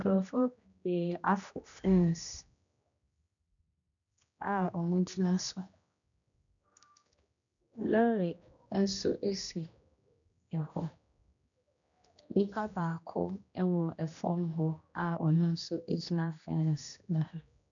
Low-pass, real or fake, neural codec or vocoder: 7.2 kHz; fake; codec, 16 kHz, 1 kbps, X-Codec, HuBERT features, trained on general audio